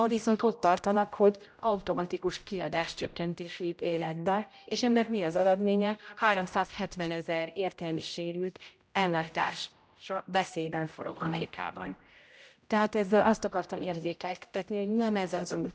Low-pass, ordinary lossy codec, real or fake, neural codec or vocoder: none; none; fake; codec, 16 kHz, 0.5 kbps, X-Codec, HuBERT features, trained on general audio